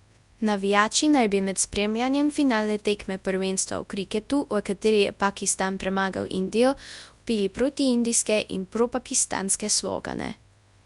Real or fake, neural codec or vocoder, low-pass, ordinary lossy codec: fake; codec, 24 kHz, 0.9 kbps, WavTokenizer, large speech release; 10.8 kHz; Opus, 64 kbps